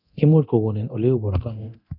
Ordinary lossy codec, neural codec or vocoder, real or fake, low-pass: none; codec, 24 kHz, 0.9 kbps, DualCodec; fake; 5.4 kHz